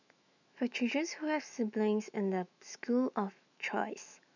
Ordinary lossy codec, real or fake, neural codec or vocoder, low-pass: none; fake; autoencoder, 48 kHz, 128 numbers a frame, DAC-VAE, trained on Japanese speech; 7.2 kHz